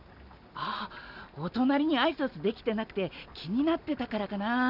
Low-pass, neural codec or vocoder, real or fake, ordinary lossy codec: 5.4 kHz; none; real; none